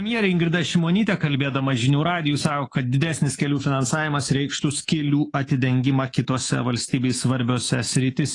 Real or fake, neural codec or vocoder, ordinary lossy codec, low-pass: fake; codec, 24 kHz, 3.1 kbps, DualCodec; AAC, 32 kbps; 10.8 kHz